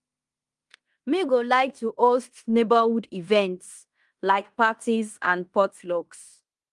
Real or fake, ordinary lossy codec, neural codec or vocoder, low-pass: fake; Opus, 32 kbps; codec, 16 kHz in and 24 kHz out, 0.9 kbps, LongCat-Audio-Codec, fine tuned four codebook decoder; 10.8 kHz